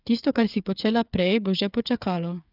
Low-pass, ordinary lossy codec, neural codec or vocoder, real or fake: 5.4 kHz; none; codec, 16 kHz, 8 kbps, FreqCodec, smaller model; fake